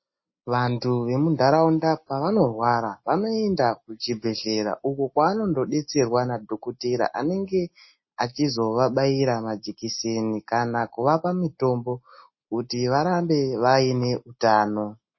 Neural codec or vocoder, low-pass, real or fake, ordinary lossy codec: none; 7.2 kHz; real; MP3, 24 kbps